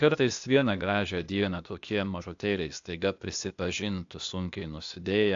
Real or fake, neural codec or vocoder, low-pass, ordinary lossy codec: fake; codec, 16 kHz, 0.8 kbps, ZipCodec; 7.2 kHz; AAC, 64 kbps